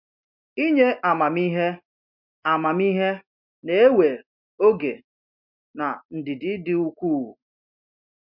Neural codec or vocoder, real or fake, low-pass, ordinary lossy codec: none; real; 5.4 kHz; MP3, 48 kbps